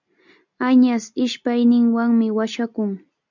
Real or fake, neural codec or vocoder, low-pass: real; none; 7.2 kHz